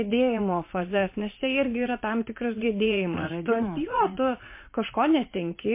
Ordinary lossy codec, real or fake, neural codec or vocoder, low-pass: MP3, 24 kbps; fake; vocoder, 44.1 kHz, 80 mel bands, Vocos; 3.6 kHz